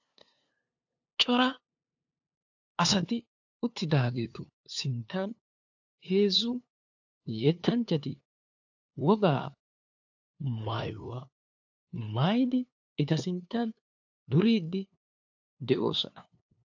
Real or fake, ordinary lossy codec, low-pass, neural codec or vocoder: fake; AAC, 48 kbps; 7.2 kHz; codec, 16 kHz, 2 kbps, FunCodec, trained on LibriTTS, 25 frames a second